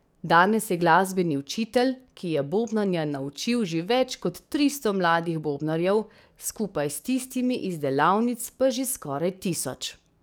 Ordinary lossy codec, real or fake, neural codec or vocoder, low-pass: none; fake; codec, 44.1 kHz, 7.8 kbps, DAC; none